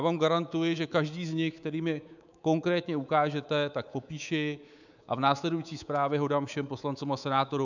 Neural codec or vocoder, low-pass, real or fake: codec, 24 kHz, 3.1 kbps, DualCodec; 7.2 kHz; fake